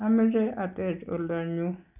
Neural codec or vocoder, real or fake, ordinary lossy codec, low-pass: none; real; none; 3.6 kHz